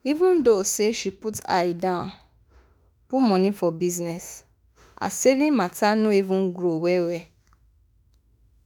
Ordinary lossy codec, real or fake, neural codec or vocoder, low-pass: none; fake; autoencoder, 48 kHz, 32 numbers a frame, DAC-VAE, trained on Japanese speech; none